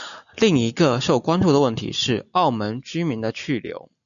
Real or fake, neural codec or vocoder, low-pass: real; none; 7.2 kHz